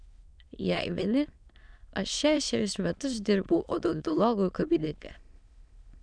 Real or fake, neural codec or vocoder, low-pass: fake; autoencoder, 22.05 kHz, a latent of 192 numbers a frame, VITS, trained on many speakers; 9.9 kHz